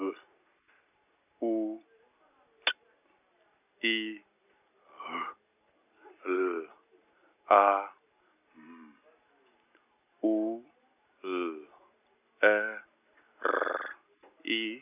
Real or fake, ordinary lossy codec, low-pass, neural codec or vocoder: real; none; 3.6 kHz; none